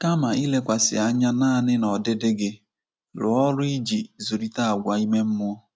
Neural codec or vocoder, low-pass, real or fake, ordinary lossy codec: none; none; real; none